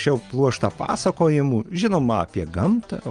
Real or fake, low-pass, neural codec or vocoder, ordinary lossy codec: real; 9.9 kHz; none; Opus, 24 kbps